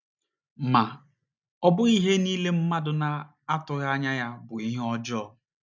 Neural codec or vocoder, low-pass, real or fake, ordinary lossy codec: none; 7.2 kHz; real; none